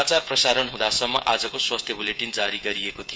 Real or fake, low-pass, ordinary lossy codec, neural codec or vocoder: fake; none; none; codec, 16 kHz, 16 kbps, FreqCodec, smaller model